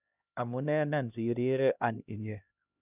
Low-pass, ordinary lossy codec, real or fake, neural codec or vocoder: 3.6 kHz; none; fake; codec, 16 kHz, 1 kbps, X-Codec, HuBERT features, trained on LibriSpeech